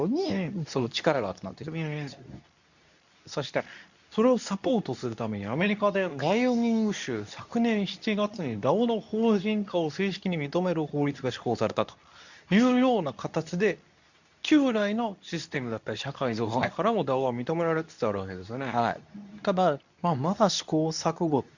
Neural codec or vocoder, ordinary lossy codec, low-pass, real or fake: codec, 24 kHz, 0.9 kbps, WavTokenizer, medium speech release version 2; none; 7.2 kHz; fake